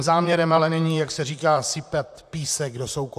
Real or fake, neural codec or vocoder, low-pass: fake; vocoder, 44.1 kHz, 128 mel bands, Pupu-Vocoder; 14.4 kHz